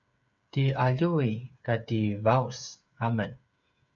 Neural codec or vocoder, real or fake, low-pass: codec, 16 kHz, 8 kbps, FreqCodec, smaller model; fake; 7.2 kHz